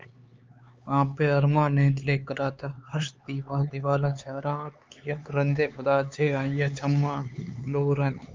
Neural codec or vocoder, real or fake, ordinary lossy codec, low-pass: codec, 16 kHz, 4 kbps, X-Codec, HuBERT features, trained on LibriSpeech; fake; Opus, 32 kbps; 7.2 kHz